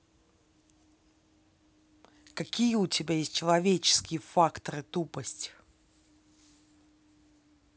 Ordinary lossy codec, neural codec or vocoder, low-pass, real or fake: none; none; none; real